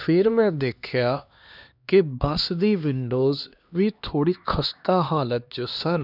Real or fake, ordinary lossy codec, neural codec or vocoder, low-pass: fake; none; codec, 16 kHz, 2 kbps, X-Codec, HuBERT features, trained on LibriSpeech; 5.4 kHz